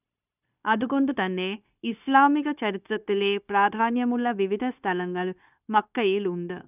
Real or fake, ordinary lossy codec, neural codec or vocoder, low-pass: fake; none; codec, 16 kHz, 0.9 kbps, LongCat-Audio-Codec; 3.6 kHz